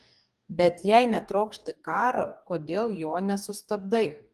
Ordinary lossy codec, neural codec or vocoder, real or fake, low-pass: Opus, 32 kbps; codec, 44.1 kHz, 2.6 kbps, DAC; fake; 14.4 kHz